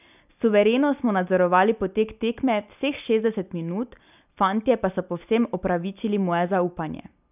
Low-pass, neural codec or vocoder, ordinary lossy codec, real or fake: 3.6 kHz; none; none; real